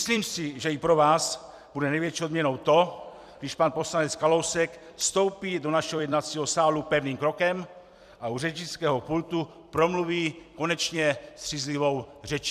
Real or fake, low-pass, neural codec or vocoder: fake; 14.4 kHz; vocoder, 44.1 kHz, 128 mel bands every 256 samples, BigVGAN v2